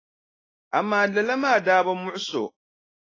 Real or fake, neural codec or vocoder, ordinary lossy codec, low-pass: real; none; AAC, 32 kbps; 7.2 kHz